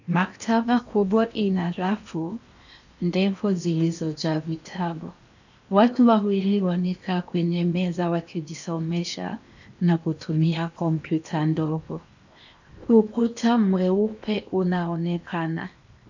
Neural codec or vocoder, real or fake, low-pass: codec, 16 kHz in and 24 kHz out, 0.8 kbps, FocalCodec, streaming, 65536 codes; fake; 7.2 kHz